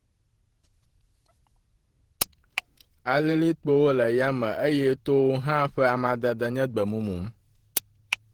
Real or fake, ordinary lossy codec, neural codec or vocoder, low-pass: fake; Opus, 16 kbps; vocoder, 48 kHz, 128 mel bands, Vocos; 19.8 kHz